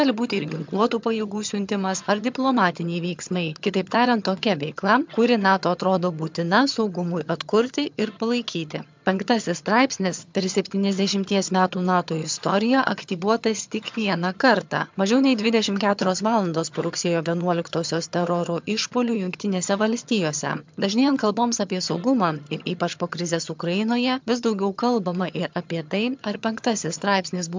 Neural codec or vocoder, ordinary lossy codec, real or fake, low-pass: vocoder, 22.05 kHz, 80 mel bands, HiFi-GAN; MP3, 64 kbps; fake; 7.2 kHz